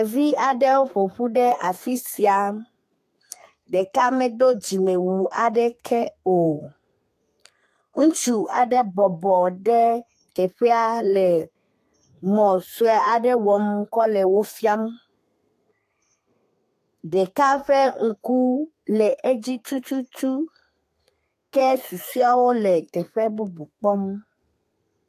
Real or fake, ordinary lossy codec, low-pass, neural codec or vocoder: fake; AAC, 64 kbps; 14.4 kHz; codec, 32 kHz, 1.9 kbps, SNAC